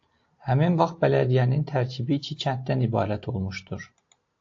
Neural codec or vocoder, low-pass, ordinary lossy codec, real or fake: none; 7.2 kHz; AAC, 48 kbps; real